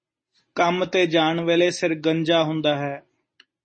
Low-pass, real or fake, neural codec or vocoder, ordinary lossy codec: 9.9 kHz; real; none; MP3, 32 kbps